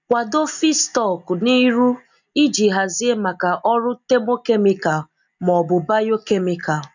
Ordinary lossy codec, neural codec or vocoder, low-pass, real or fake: none; none; 7.2 kHz; real